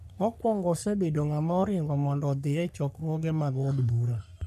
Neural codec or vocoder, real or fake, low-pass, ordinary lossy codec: codec, 44.1 kHz, 3.4 kbps, Pupu-Codec; fake; 14.4 kHz; none